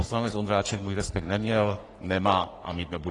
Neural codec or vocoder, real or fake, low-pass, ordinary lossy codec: codec, 44.1 kHz, 3.4 kbps, Pupu-Codec; fake; 10.8 kHz; AAC, 32 kbps